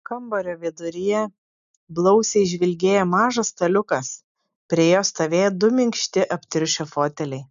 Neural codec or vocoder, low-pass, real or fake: none; 7.2 kHz; real